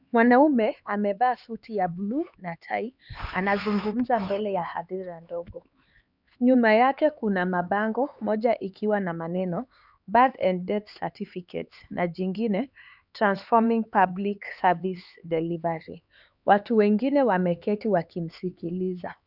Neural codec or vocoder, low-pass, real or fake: codec, 16 kHz, 4 kbps, X-Codec, HuBERT features, trained on LibriSpeech; 5.4 kHz; fake